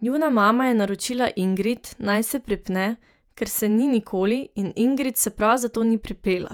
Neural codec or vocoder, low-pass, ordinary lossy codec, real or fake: vocoder, 48 kHz, 128 mel bands, Vocos; 19.8 kHz; none; fake